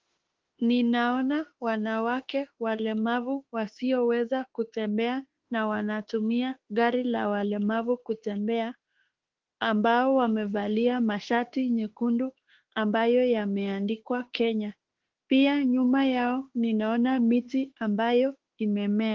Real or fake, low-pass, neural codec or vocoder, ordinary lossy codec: fake; 7.2 kHz; autoencoder, 48 kHz, 32 numbers a frame, DAC-VAE, trained on Japanese speech; Opus, 16 kbps